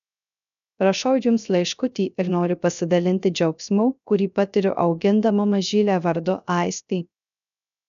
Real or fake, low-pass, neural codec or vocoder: fake; 7.2 kHz; codec, 16 kHz, 0.3 kbps, FocalCodec